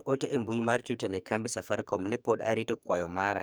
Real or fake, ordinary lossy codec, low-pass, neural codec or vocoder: fake; none; none; codec, 44.1 kHz, 2.6 kbps, SNAC